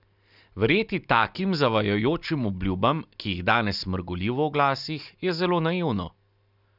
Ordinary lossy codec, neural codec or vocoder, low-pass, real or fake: none; none; 5.4 kHz; real